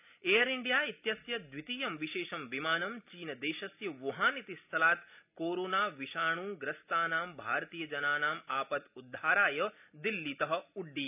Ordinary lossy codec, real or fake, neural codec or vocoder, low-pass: none; real; none; 3.6 kHz